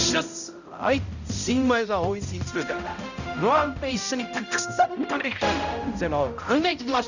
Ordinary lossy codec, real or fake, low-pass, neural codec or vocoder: none; fake; 7.2 kHz; codec, 16 kHz, 0.5 kbps, X-Codec, HuBERT features, trained on balanced general audio